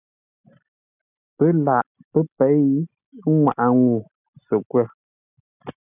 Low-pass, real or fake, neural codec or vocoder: 3.6 kHz; real; none